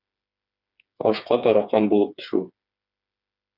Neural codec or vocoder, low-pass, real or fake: codec, 16 kHz, 4 kbps, FreqCodec, smaller model; 5.4 kHz; fake